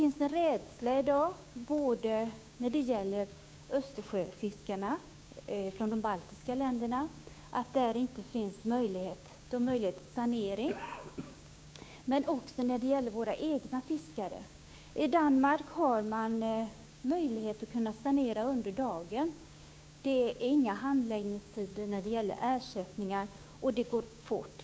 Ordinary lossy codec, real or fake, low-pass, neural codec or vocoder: none; fake; none; codec, 16 kHz, 6 kbps, DAC